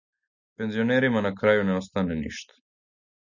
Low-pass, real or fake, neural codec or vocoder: 7.2 kHz; real; none